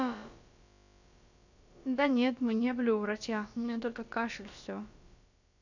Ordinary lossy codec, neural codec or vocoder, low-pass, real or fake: none; codec, 16 kHz, about 1 kbps, DyCAST, with the encoder's durations; 7.2 kHz; fake